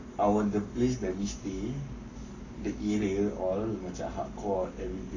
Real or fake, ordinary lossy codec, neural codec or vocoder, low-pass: fake; AAC, 48 kbps; codec, 44.1 kHz, 7.8 kbps, Pupu-Codec; 7.2 kHz